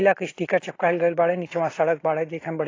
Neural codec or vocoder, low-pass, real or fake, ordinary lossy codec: none; 7.2 kHz; real; AAC, 32 kbps